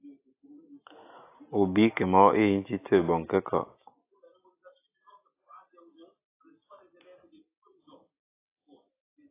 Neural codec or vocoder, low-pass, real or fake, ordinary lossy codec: none; 3.6 kHz; real; AAC, 24 kbps